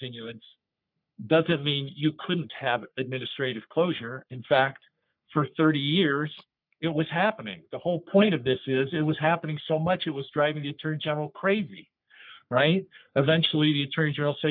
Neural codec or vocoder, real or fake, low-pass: codec, 44.1 kHz, 3.4 kbps, Pupu-Codec; fake; 5.4 kHz